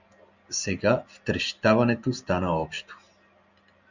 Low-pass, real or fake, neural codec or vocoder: 7.2 kHz; real; none